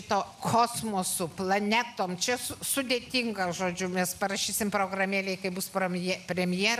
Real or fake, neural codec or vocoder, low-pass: real; none; 14.4 kHz